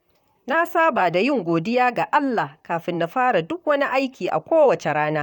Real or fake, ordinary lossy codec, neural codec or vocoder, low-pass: fake; none; vocoder, 44.1 kHz, 128 mel bands, Pupu-Vocoder; 19.8 kHz